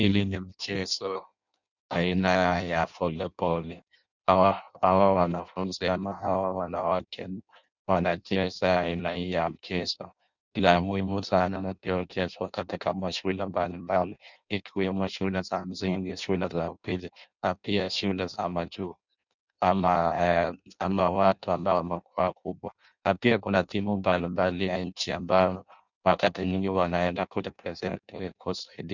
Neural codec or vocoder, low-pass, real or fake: codec, 16 kHz in and 24 kHz out, 0.6 kbps, FireRedTTS-2 codec; 7.2 kHz; fake